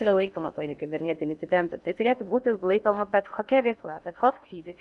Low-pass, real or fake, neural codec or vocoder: 10.8 kHz; fake; codec, 16 kHz in and 24 kHz out, 0.8 kbps, FocalCodec, streaming, 65536 codes